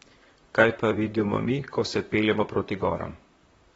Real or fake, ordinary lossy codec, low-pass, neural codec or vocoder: fake; AAC, 24 kbps; 19.8 kHz; vocoder, 44.1 kHz, 128 mel bands, Pupu-Vocoder